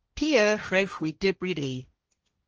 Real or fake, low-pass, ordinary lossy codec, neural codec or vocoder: fake; 7.2 kHz; Opus, 24 kbps; codec, 16 kHz, 1.1 kbps, Voila-Tokenizer